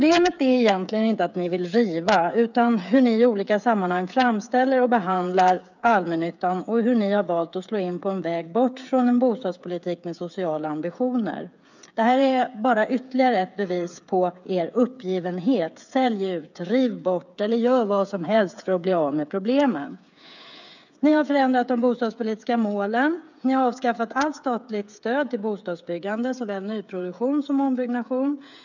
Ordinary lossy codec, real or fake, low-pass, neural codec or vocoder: none; fake; 7.2 kHz; codec, 16 kHz, 8 kbps, FreqCodec, smaller model